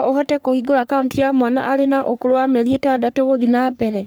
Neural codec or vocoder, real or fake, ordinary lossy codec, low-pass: codec, 44.1 kHz, 3.4 kbps, Pupu-Codec; fake; none; none